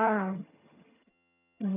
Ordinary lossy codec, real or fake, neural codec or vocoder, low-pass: none; fake; vocoder, 22.05 kHz, 80 mel bands, HiFi-GAN; 3.6 kHz